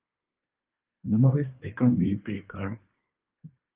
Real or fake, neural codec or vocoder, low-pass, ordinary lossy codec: fake; codec, 24 kHz, 1 kbps, SNAC; 3.6 kHz; Opus, 32 kbps